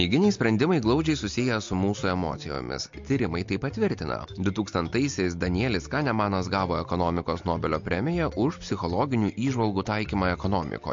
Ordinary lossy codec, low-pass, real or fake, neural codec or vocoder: MP3, 48 kbps; 7.2 kHz; real; none